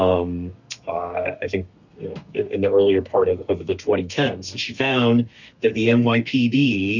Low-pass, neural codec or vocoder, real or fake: 7.2 kHz; codec, 32 kHz, 1.9 kbps, SNAC; fake